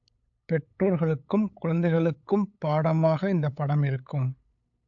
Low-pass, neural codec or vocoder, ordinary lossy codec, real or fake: 7.2 kHz; codec, 16 kHz, 8 kbps, FunCodec, trained on LibriTTS, 25 frames a second; AAC, 64 kbps; fake